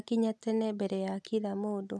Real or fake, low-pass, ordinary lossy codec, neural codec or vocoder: real; none; none; none